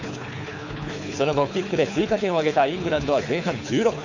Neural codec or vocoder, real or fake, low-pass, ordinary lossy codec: codec, 24 kHz, 6 kbps, HILCodec; fake; 7.2 kHz; none